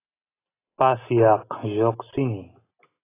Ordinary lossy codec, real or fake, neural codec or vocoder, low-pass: AAC, 16 kbps; real; none; 3.6 kHz